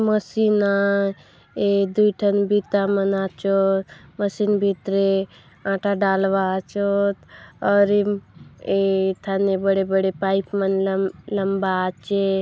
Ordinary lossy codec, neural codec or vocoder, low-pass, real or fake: none; none; none; real